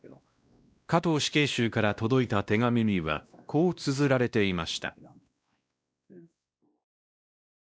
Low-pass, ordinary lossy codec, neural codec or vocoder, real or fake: none; none; codec, 16 kHz, 1 kbps, X-Codec, WavLM features, trained on Multilingual LibriSpeech; fake